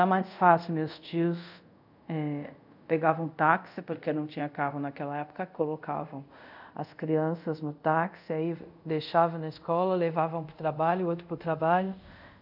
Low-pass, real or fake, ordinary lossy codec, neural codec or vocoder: 5.4 kHz; fake; none; codec, 24 kHz, 0.5 kbps, DualCodec